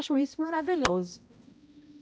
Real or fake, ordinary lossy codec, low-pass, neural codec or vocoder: fake; none; none; codec, 16 kHz, 0.5 kbps, X-Codec, HuBERT features, trained on balanced general audio